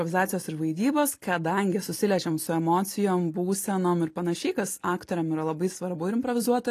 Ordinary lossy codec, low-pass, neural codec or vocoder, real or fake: AAC, 48 kbps; 14.4 kHz; none; real